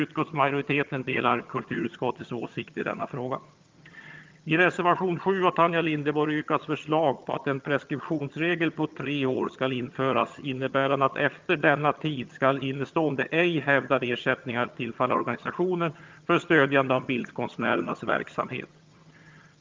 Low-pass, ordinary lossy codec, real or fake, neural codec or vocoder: 7.2 kHz; Opus, 32 kbps; fake; vocoder, 22.05 kHz, 80 mel bands, HiFi-GAN